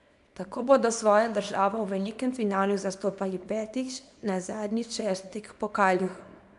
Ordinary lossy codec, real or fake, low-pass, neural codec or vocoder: AAC, 96 kbps; fake; 10.8 kHz; codec, 24 kHz, 0.9 kbps, WavTokenizer, small release